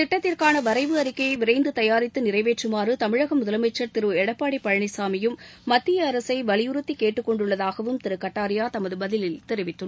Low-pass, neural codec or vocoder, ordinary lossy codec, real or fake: none; none; none; real